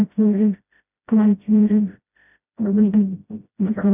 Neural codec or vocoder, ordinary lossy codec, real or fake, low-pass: codec, 16 kHz, 0.5 kbps, FreqCodec, smaller model; none; fake; 3.6 kHz